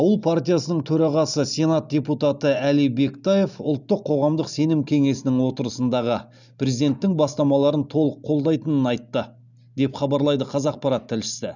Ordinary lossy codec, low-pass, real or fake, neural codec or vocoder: none; 7.2 kHz; real; none